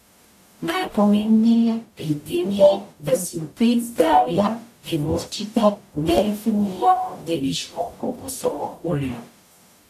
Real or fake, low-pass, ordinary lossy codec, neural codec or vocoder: fake; 14.4 kHz; none; codec, 44.1 kHz, 0.9 kbps, DAC